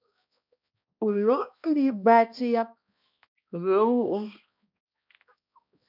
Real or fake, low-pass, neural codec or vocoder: fake; 5.4 kHz; codec, 16 kHz, 1 kbps, X-Codec, HuBERT features, trained on balanced general audio